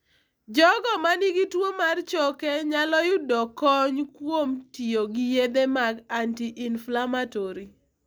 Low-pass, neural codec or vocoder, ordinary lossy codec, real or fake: none; none; none; real